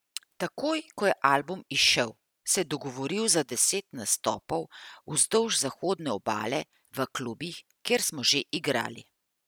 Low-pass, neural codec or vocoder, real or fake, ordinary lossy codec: none; none; real; none